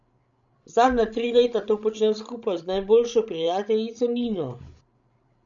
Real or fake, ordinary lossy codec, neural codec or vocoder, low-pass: fake; none; codec, 16 kHz, 16 kbps, FreqCodec, larger model; 7.2 kHz